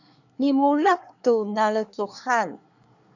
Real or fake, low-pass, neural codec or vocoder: fake; 7.2 kHz; codec, 24 kHz, 1 kbps, SNAC